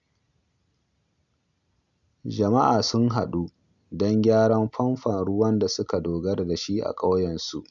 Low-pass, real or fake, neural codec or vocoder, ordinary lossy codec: 7.2 kHz; real; none; none